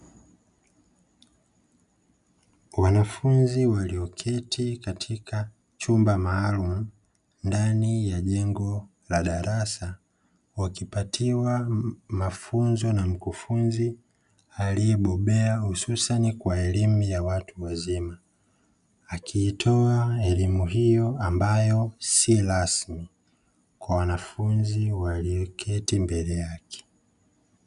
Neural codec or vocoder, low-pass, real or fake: none; 10.8 kHz; real